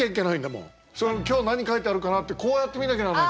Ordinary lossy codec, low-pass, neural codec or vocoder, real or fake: none; none; none; real